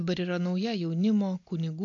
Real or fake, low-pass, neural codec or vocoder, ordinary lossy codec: real; 7.2 kHz; none; AAC, 48 kbps